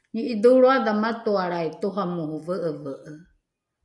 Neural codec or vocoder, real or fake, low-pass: none; real; 10.8 kHz